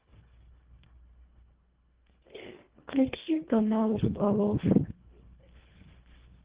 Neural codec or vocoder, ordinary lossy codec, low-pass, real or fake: codec, 24 kHz, 1.5 kbps, HILCodec; Opus, 16 kbps; 3.6 kHz; fake